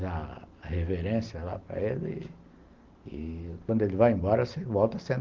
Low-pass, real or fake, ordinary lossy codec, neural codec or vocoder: 7.2 kHz; real; Opus, 32 kbps; none